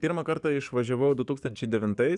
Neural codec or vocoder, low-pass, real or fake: codec, 44.1 kHz, 7.8 kbps, DAC; 10.8 kHz; fake